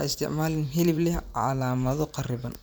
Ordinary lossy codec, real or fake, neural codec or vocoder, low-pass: none; fake; vocoder, 44.1 kHz, 128 mel bands every 512 samples, BigVGAN v2; none